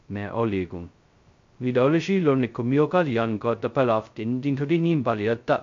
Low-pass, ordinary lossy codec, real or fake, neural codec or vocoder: 7.2 kHz; MP3, 48 kbps; fake; codec, 16 kHz, 0.2 kbps, FocalCodec